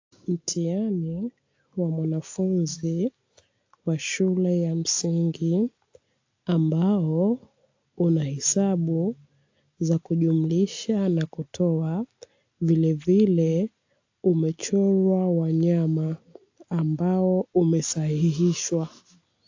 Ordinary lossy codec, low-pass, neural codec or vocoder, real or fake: AAC, 48 kbps; 7.2 kHz; none; real